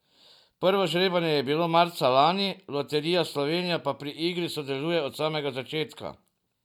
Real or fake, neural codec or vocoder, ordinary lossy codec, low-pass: real; none; none; 19.8 kHz